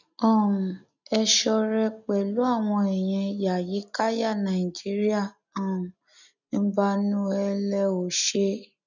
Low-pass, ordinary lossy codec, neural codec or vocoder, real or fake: 7.2 kHz; none; none; real